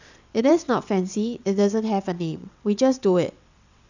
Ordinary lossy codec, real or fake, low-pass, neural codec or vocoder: none; fake; 7.2 kHz; vocoder, 22.05 kHz, 80 mel bands, WaveNeXt